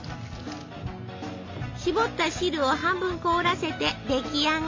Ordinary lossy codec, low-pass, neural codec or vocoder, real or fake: MP3, 48 kbps; 7.2 kHz; none; real